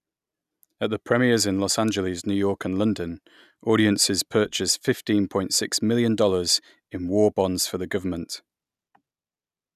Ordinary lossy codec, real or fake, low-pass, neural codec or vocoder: none; real; 14.4 kHz; none